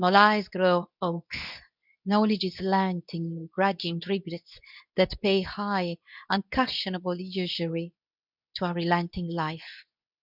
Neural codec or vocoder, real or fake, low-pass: codec, 24 kHz, 0.9 kbps, WavTokenizer, medium speech release version 2; fake; 5.4 kHz